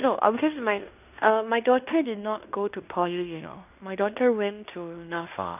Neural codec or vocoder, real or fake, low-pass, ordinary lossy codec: codec, 16 kHz in and 24 kHz out, 0.9 kbps, LongCat-Audio-Codec, fine tuned four codebook decoder; fake; 3.6 kHz; none